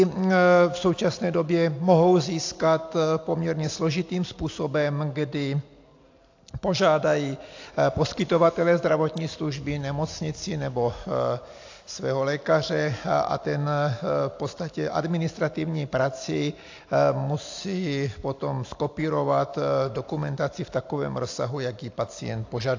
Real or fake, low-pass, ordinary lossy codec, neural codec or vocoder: real; 7.2 kHz; AAC, 48 kbps; none